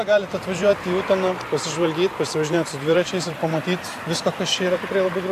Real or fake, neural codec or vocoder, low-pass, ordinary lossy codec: fake; vocoder, 44.1 kHz, 128 mel bands every 512 samples, BigVGAN v2; 14.4 kHz; AAC, 64 kbps